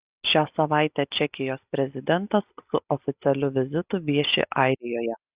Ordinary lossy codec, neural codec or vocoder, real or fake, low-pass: Opus, 64 kbps; none; real; 3.6 kHz